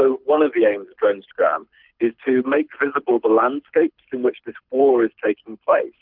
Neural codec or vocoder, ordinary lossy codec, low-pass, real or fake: codec, 24 kHz, 6 kbps, HILCodec; Opus, 24 kbps; 5.4 kHz; fake